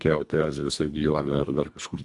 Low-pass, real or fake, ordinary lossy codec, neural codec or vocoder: 10.8 kHz; fake; AAC, 64 kbps; codec, 24 kHz, 1.5 kbps, HILCodec